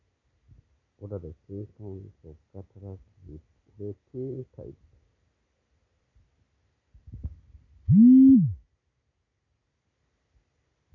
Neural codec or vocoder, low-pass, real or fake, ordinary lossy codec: none; 7.2 kHz; real; none